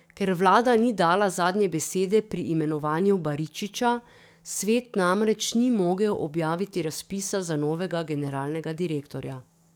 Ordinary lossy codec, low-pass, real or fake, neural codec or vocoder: none; none; fake; codec, 44.1 kHz, 7.8 kbps, DAC